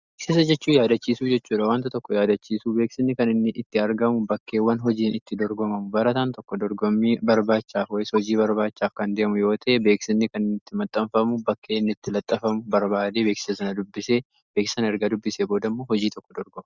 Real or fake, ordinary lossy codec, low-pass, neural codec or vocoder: real; Opus, 64 kbps; 7.2 kHz; none